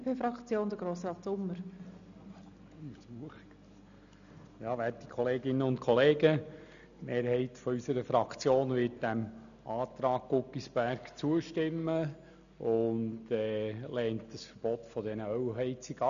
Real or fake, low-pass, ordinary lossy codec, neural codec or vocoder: real; 7.2 kHz; none; none